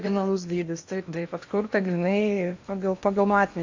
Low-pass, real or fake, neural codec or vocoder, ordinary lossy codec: 7.2 kHz; fake; codec, 16 kHz in and 24 kHz out, 0.8 kbps, FocalCodec, streaming, 65536 codes; AAC, 48 kbps